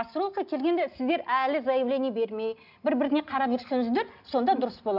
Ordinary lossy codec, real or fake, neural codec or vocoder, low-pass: none; real; none; 5.4 kHz